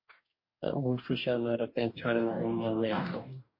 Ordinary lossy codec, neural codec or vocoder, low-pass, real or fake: MP3, 32 kbps; codec, 44.1 kHz, 2.6 kbps, DAC; 5.4 kHz; fake